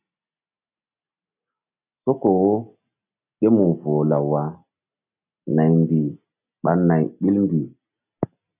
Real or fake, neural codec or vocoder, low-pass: real; none; 3.6 kHz